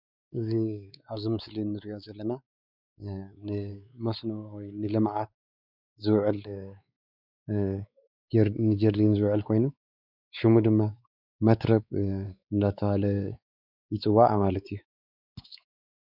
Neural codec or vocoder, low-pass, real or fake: codec, 16 kHz, 4 kbps, X-Codec, WavLM features, trained on Multilingual LibriSpeech; 5.4 kHz; fake